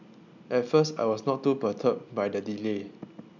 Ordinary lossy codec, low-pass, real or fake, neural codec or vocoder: none; 7.2 kHz; real; none